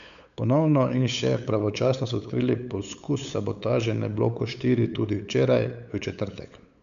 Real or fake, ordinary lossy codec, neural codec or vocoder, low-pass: fake; MP3, 96 kbps; codec, 16 kHz, 8 kbps, FunCodec, trained on LibriTTS, 25 frames a second; 7.2 kHz